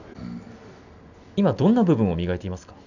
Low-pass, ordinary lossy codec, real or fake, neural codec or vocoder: 7.2 kHz; none; real; none